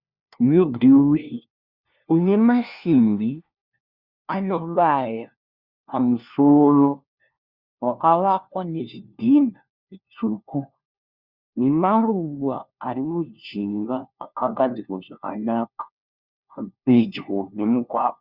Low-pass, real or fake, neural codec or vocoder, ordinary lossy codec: 5.4 kHz; fake; codec, 16 kHz, 1 kbps, FunCodec, trained on LibriTTS, 50 frames a second; Opus, 64 kbps